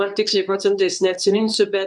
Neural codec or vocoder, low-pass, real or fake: codec, 24 kHz, 0.9 kbps, WavTokenizer, medium speech release version 2; 10.8 kHz; fake